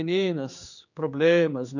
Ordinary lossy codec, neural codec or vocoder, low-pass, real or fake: none; codec, 16 kHz, 4 kbps, X-Codec, HuBERT features, trained on general audio; 7.2 kHz; fake